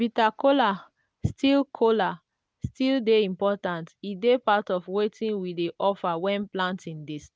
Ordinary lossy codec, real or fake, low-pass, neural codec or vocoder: none; real; none; none